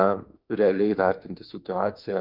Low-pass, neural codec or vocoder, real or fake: 5.4 kHz; autoencoder, 48 kHz, 32 numbers a frame, DAC-VAE, trained on Japanese speech; fake